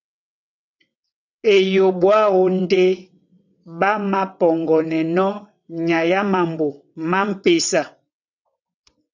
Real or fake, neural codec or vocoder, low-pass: fake; vocoder, 22.05 kHz, 80 mel bands, WaveNeXt; 7.2 kHz